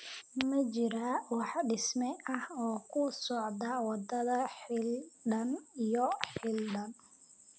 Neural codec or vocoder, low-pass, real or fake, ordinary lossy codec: none; none; real; none